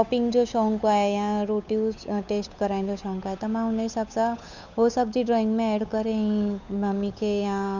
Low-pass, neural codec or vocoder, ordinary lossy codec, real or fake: 7.2 kHz; codec, 16 kHz, 8 kbps, FunCodec, trained on Chinese and English, 25 frames a second; none; fake